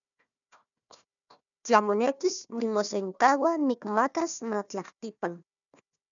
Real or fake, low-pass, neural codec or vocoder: fake; 7.2 kHz; codec, 16 kHz, 1 kbps, FunCodec, trained on Chinese and English, 50 frames a second